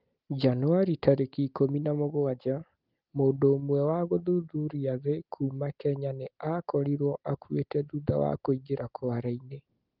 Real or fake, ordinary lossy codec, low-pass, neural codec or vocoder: real; Opus, 32 kbps; 5.4 kHz; none